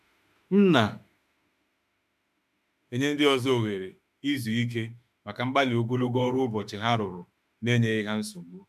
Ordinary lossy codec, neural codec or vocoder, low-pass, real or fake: MP3, 96 kbps; autoencoder, 48 kHz, 32 numbers a frame, DAC-VAE, trained on Japanese speech; 14.4 kHz; fake